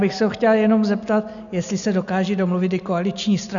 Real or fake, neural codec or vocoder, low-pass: real; none; 7.2 kHz